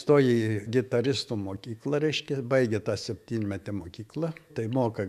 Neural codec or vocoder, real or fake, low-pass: autoencoder, 48 kHz, 128 numbers a frame, DAC-VAE, trained on Japanese speech; fake; 14.4 kHz